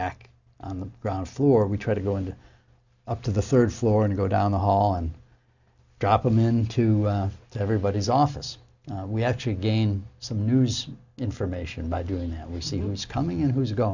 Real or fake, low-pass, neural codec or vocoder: real; 7.2 kHz; none